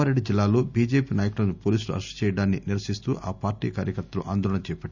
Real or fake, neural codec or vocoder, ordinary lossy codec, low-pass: real; none; none; 7.2 kHz